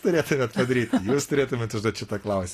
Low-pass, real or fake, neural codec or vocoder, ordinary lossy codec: 14.4 kHz; real; none; AAC, 48 kbps